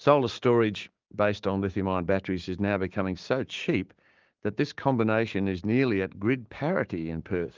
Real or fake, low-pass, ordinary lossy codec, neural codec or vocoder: fake; 7.2 kHz; Opus, 32 kbps; codec, 16 kHz, 6 kbps, DAC